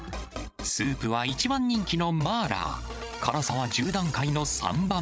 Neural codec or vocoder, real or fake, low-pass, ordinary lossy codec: codec, 16 kHz, 16 kbps, FreqCodec, larger model; fake; none; none